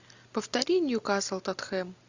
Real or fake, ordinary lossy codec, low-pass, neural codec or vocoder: real; Opus, 64 kbps; 7.2 kHz; none